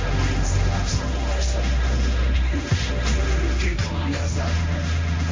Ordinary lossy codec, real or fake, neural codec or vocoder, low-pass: MP3, 64 kbps; fake; codec, 16 kHz, 1.1 kbps, Voila-Tokenizer; 7.2 kHz